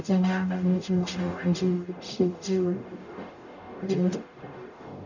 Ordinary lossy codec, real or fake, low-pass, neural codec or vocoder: none; fake; 7.2 kHz; codec, 44.1 kHz, 0.9 kbps, DAC